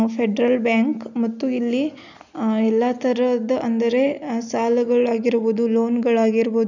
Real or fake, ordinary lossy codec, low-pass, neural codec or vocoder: real; none; 7.2 kHz; none